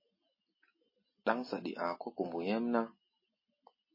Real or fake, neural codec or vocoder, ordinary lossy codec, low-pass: real; none; MP3, 24 kbps; 5.4 kHz